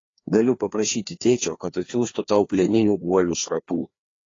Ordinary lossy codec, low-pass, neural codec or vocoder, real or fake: AAC, 32 kbps; 7.2 kHz; codec, 16 kHz, 2 kbps, FreqCodec, larger model; fake